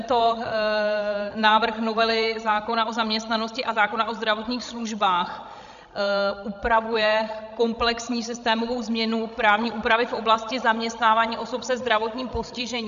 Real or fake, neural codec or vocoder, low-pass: fake; codec, 16 kHz, 16 kbps, FreqCodec, larger model; 7.2 kHz